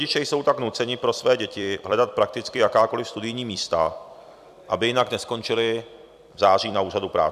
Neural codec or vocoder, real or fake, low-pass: vocoder, 44.1 kHz, 128 mel bands every 256 samples, BigVGAN v2; fake; 14.4 kHz